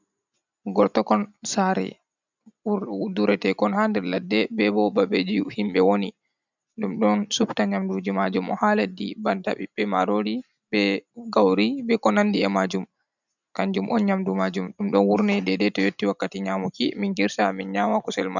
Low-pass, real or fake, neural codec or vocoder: 7.2 kHz; real; none